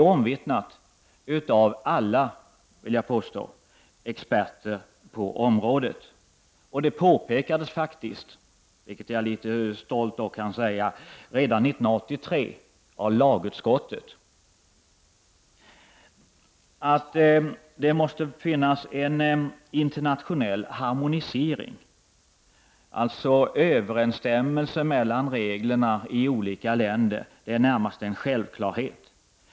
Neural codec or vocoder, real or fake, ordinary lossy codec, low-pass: none; real; none; none